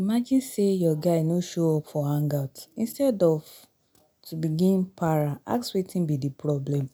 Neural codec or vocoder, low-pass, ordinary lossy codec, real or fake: none; none; none; real